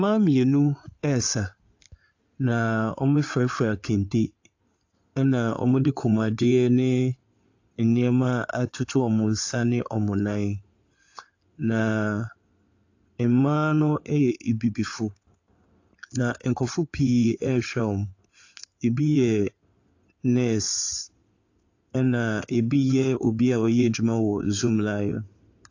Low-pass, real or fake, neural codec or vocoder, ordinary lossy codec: 7.2 kHz; fake; codec, 16 kHz in and 24 kHz out, 2.2 kbps, FireRedTTS-2 codec; AAC, 48 kbps